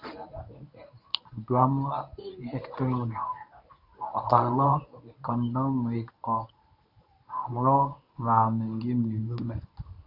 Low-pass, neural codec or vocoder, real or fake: 5.4 kHz; codec, 24 kHz, 0.9 kbps, WavTokenizer, medium speech release version 1; fake